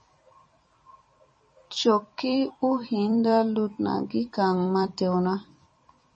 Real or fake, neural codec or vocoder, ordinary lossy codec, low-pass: fake; vocoder, 44.1 kHz, 128 mel bands every 256 samples, BigVGAN v2; MP3, 32 kbps; 10.8 kHz